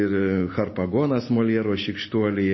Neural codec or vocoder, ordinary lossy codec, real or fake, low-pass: none; MP3, 24 kbps; real; 7.2 kHz